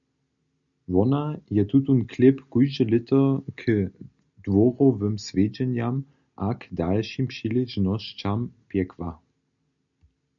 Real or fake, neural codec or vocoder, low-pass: real; none; 7.2 kHz